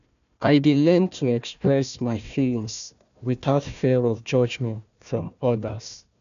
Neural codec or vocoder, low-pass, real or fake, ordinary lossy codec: codec, 16 kHz, 1 kbps, FunCodec, trained on Chinese and English, 50 frames a second; 7.2 kHz; fake; none